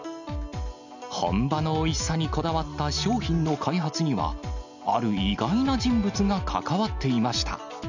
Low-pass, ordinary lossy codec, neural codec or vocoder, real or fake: 7.2 kHz; none; none; real